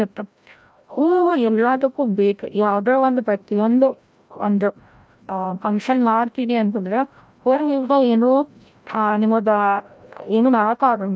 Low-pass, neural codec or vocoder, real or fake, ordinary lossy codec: none; codec, 16 kHz, 0.5 kbps, FreqCodec, larger model; fake; none